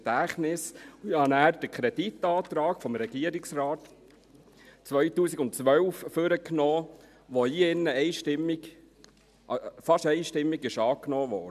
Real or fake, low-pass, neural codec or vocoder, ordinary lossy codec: real; 14.4 kHz; none; none